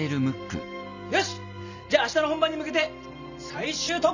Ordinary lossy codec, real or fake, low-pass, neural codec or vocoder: none; real; 7.2 kHz; none